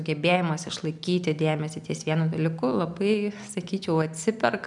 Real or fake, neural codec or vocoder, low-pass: real; none; 10.8 kHz